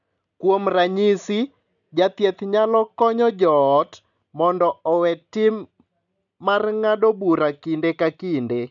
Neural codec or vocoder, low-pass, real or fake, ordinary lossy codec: none; 7.2 kHz; real; none